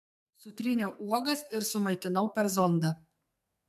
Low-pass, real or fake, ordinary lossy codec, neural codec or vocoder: 14.4 kHz; fake; MP3, 96 kbps; codec, 44.1 kHz, 2.6 kbps, SNAC